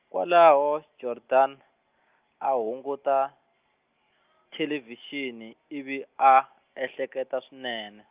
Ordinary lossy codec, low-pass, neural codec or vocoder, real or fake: Opus, 24 kbps; 3.6 kHz; none; real